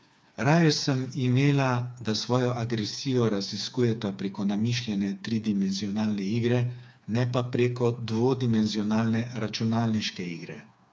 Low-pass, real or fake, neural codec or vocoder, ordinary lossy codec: none; fake; codec, 16 kHz, 4 kbps, FreqCodec, smaller model; none